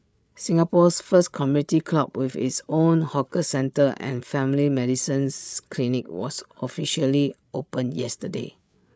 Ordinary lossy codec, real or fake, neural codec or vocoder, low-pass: none; fake; codec, 16 kHz, 8 kbps, FreqCodec, larger model; none